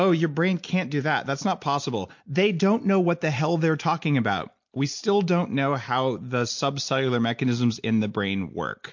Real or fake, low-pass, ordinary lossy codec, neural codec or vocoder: real; 7.2 kHz; MP3, 48 kbps; none